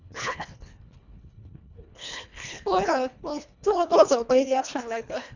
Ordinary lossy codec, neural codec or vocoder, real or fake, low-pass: none; codec, 24 kHz, 1.5 kbps, HILCodec; fake; 7.2 kHz